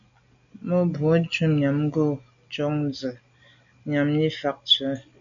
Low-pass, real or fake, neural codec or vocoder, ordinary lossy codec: 7.2 kHz; real; none; MP3, 96 kbps